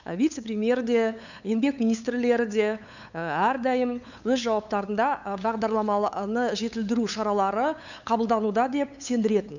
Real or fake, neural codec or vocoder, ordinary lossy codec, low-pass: fake; codec, 16 kHz, 8 kbps, FunCodec, trained on LibriTTS, 25 frames a second; none; 7.2 kHz